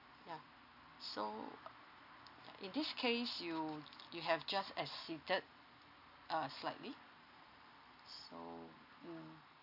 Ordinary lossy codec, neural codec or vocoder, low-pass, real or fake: none; none; 5.4 kHz; real